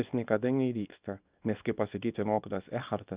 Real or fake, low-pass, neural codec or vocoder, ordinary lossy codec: fake; 3.6 kHz; codec, 24 kHz, 0.9 kbps, WavTokenizer, small release; Opus, 64 kbps